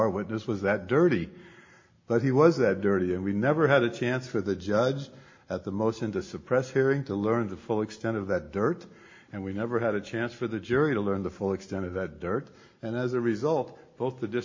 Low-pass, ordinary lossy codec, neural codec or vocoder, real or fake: 7.2 kHz; MP3, 32 kbps; none; real